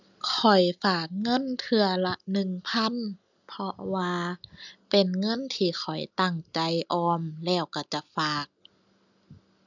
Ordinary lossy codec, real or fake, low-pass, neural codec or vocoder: none; real; 7.2 kHz; none